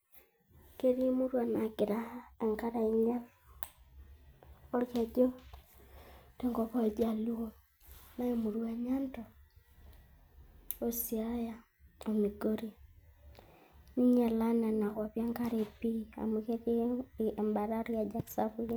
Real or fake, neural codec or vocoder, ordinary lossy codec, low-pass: real; none; none; none